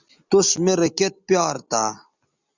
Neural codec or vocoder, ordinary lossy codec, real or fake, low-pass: none; Opus, 64 kbps; real; 7.2 kHz